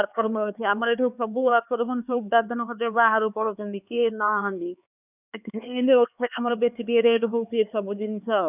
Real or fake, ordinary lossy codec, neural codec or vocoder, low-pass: fake; none; codec, 16 kHz, 4 kbps, X-Codec, HuBERT features, trained on LibriSpeech; 3.6 kHz